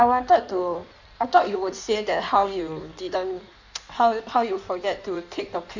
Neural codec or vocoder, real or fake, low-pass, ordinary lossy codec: codec, 16 kHz in and 24 kHz out, 1.1 kbps, FireRedTTS-2 codec; fake; 7.2 kHz; none